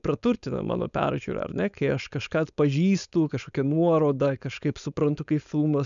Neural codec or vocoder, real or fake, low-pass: codec, 16 kHz, 4.8 kbps, FACodec; fake; 7.2 kHz